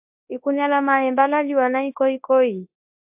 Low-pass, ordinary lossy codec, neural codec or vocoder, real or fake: 3.6 kHz; AAC, 32 kbps; codec, 24 kHz, 0.9 kbps, WavTokenizer, large speech release; fake